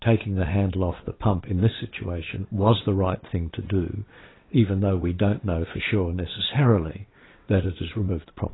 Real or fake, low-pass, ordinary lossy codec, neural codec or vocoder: fake; 7.2 kHz; AAC, 16 kbps; vocoder, 22.05 kHz, 80 mel bands, WaveNeXt